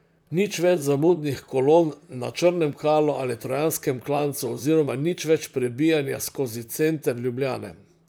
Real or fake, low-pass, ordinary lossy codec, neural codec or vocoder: fake; none; none; vocoder, 44.1 kHz, 128 mel bands, Pupu-Vocoder